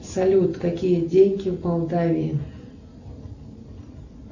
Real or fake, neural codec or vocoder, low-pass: real; none; 7.2 kHz